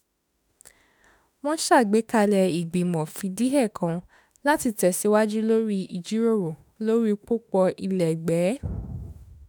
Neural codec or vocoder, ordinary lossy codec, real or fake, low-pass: autoencoder, 48 kHz, 32 numbers a frame, DAC-VAE, trained on Japanese speech; none; fake; none